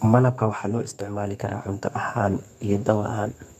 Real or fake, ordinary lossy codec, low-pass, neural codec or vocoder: fake; none; 14.4 kHz; codec, 32 kHz, 1.9 kbps, SNAC